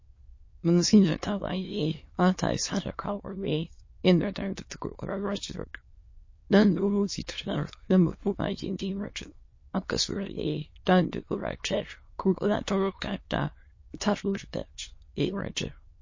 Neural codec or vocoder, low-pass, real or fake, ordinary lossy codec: autoencoder, 22.05 kHz, a latent of 192 numbers a frame, VITS, trained on many speakers; 7.2 kHz; fake; MP3, 32 kbps